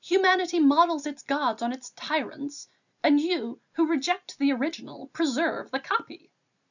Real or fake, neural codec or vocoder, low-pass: real; none; 7.2 kHz